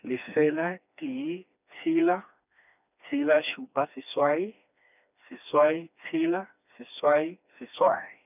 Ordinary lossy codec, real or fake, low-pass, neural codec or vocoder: none; fake; 3.6 kHz; codec, 16 kHz, 2 kbps, FreqCodec, smaller model